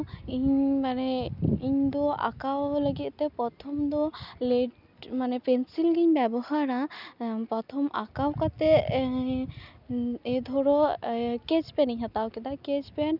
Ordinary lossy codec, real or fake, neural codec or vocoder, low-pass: none; real; none; 5.4 kHz